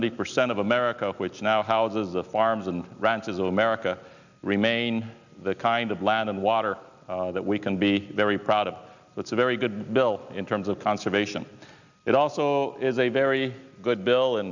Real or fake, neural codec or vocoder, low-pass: real; none; 7.2 kHz